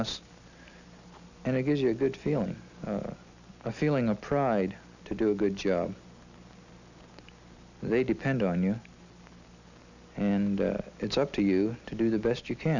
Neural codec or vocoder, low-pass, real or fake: none; 7.2 kHz; real